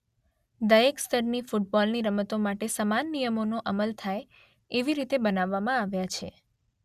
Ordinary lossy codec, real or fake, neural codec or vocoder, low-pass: Opus, 64 kbps; real; none; 14.4 kHz